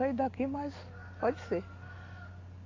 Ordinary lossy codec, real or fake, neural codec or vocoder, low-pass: AAC, 32 kbps; real; none; 7.2 kHz